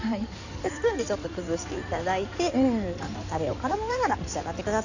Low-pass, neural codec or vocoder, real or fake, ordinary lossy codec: 7.2 kHz; codec, 16 kHz in and 24 kHz out, 2.2 kbps, FireRedTTS-2 codec; fake; none